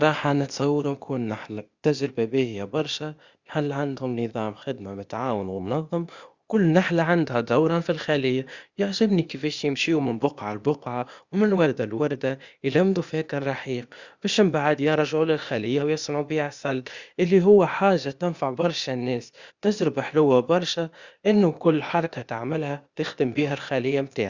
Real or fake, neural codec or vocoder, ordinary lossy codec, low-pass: fake; codec, 16 kHz, 0.8 kbps, ZipCodec; Opus, 64 kbps; 7.2 kHz